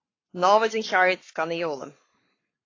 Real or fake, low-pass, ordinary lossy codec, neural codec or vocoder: fake; 7.2 kHz; AAC, 32 kbps; codec, 44.1 kHz, 7.8 kbps, Pupu-Codec